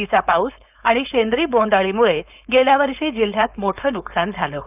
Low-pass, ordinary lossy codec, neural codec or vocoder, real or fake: 3.6 kHz; none; codec, 16 kHz, 4.8 kbps, FACodec; fake